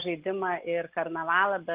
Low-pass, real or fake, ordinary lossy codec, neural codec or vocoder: 3.6 kHz; real; Opus, 32 kbps; none